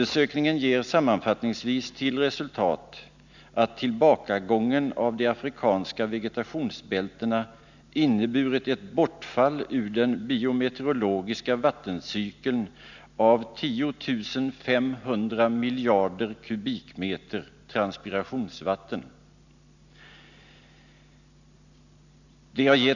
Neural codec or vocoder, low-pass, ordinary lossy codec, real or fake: none; 7.2 kHz; none; real